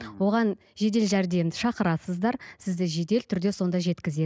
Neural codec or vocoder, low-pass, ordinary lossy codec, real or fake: none; none; none; real